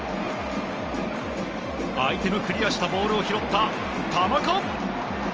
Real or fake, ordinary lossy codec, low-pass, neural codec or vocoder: real; Opus, 24 kbps; 7.2 kHz; none